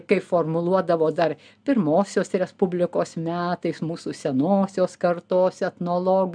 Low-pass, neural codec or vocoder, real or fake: 9.9 kHz; none; real